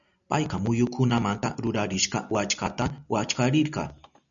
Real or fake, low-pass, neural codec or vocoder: real; 7.2 kHz; none